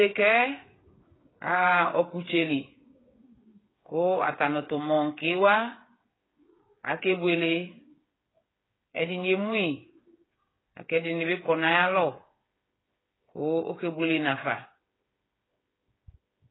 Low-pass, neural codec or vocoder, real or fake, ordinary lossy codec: 7.2 kHz; codec, 16 kHz, 8 kbps, FreqCodec, smaller model; fake; AAC, 16 kbps